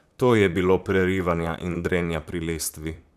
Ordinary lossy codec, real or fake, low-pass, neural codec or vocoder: none; fake; 14.4 kHz; vocoder, 44.1 kHz, 128 mel bands, Pupu-Vocoder